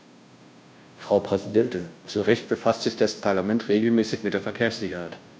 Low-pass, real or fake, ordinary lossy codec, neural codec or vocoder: none; fake; none; codec, 16 kHz, 0.5 kbps, FunCodec, trained on Chinese and English, 25 frames a second